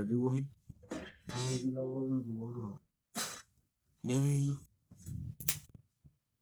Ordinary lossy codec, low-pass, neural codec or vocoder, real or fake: none; none; codec, 44.1 kHz, 1.7 kbps, Pupu-Codec; fake